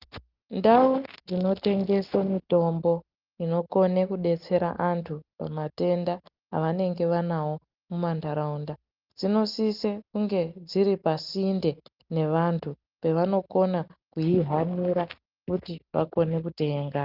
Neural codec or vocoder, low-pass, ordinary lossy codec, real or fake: none; 5.4 kHz; Opus, 32 kbps; real